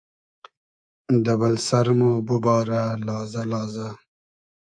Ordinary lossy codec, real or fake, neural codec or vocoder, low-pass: AAC, 64 kbps; fake; codec, 24 kHz, 3.1 kbps, DualCodec; 9.9 kHz